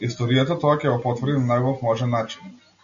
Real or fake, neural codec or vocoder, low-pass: real; none; 7.2 kHz